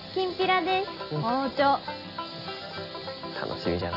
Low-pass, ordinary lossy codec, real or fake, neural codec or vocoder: 5.4 kHz; none; real; none